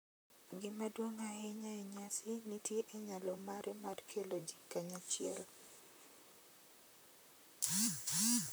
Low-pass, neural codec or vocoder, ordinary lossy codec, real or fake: none; vocoder, 44.1 kHz, 128 mel bands, Pupu-Vocoder; none; fake